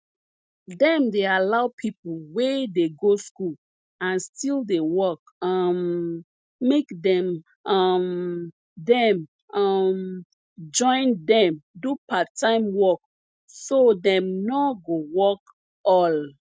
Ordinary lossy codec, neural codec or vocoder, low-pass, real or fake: none; none; none; real